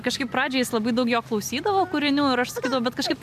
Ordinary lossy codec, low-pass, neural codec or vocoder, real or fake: MP3, 96 kbps; 14.4 kHz; none; real